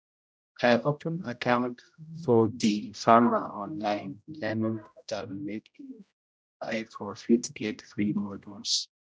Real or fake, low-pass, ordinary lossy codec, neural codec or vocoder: fake; none; none; codec, 16 kHz, 0.5 kbps, X-Codec, HuBERT features, trained on general audio